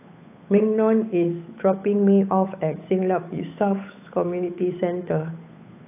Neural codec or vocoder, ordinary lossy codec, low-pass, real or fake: codec, 16 kHz, 8 kbps, FunCodec, trained on Chinese and English, 25 frames a second; AAC, 24 kbps; 3.6 kHz; fake